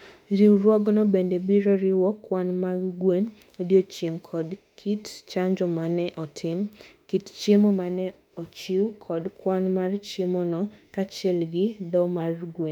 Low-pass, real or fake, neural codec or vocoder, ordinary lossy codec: 19.8 kHz; fake; autoencoder, 48 kHz, 32 numbers a frame, DAC-VAE, trained on Japanese speech; none